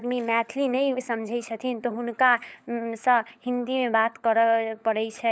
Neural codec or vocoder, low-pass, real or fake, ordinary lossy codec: codec, 16 kHz, 16 kbps, FunCodec, trained on LibriTTS, 50 frames a second; none; fake; none